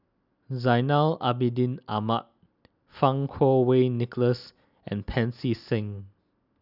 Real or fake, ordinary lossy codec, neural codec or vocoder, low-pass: real; none; none; 5.4 kHz